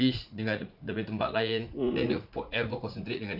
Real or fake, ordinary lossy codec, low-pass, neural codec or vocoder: fake; none; 5.4 kHz; vocoder, 44.1 kHz, 80 mel bands, Vocos